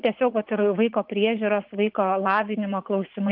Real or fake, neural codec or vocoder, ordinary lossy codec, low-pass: fake; vocoder, 44.1 kHz, 80 mel bands, Vocos; Opus, 24 kbps; 5.4 kHz